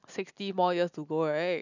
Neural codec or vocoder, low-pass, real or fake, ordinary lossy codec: none; 7.2 kHz; real; none